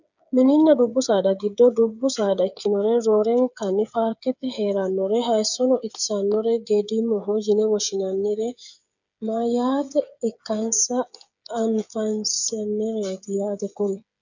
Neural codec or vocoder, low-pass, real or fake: codec, 16 kHz, 8 kbps, FreqCodec, smaller model; 7.2 kHz; fake